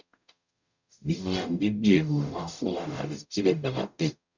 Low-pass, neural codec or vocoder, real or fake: 7.2 kHz; codec, 44.1 kHz, 0.9 kbps, DAC; fake